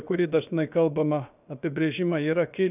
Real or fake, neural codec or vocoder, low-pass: fake; codec, 16 kHz, about 1 kbps, DyCAST, with the encoder's durations; 3.6 kHz